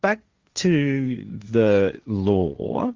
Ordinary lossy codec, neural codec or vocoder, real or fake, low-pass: Opus, 32 kbps; codec, 16 kHz, 1.1 kbps, Voila-Tokenizer; fake; 7.2 kHz